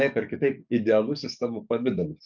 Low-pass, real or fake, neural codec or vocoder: 7.2 kHz; fake; codec, 44.1 kHz, 7.8 kbps, DAC